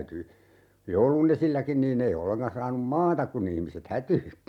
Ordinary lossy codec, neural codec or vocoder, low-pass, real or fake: MP3, 96 kbps; none; 19.8 kHz; real